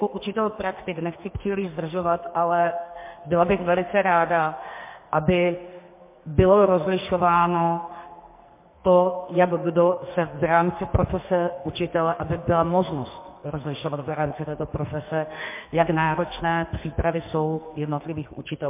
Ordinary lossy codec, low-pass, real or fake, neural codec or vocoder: MP3, 24 kbps; 3.6 kHz; fake; codec, 44.1 kHz, 2.6 kbps, SNAC